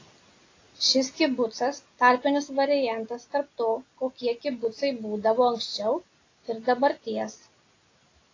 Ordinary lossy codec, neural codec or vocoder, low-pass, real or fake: AAC, 32 kbps; none; 7.2 kHz; real